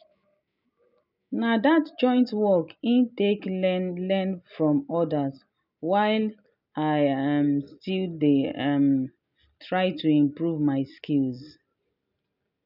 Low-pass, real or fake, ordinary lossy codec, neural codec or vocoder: 5.4 kHz; real; none; none